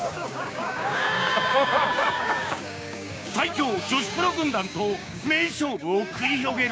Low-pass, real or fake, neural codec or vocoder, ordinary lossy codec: none; fake; codec, 16 kHz, 6 kbps, DAC; none